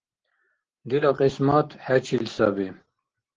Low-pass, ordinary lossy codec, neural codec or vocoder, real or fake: 7.2 kHz; Opus, 16 kbps; none; real